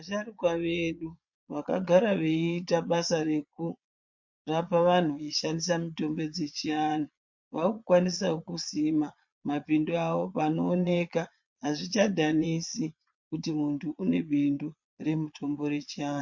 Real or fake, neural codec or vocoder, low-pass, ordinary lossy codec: fake; vocoder, 22.05 kHz, 80 mel bands, Vocos; 7.2 kHz; MP3, 48 kbps